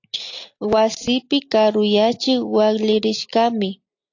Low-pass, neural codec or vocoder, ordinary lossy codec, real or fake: 7.2 kHz; none; AAC, 48 kbps; real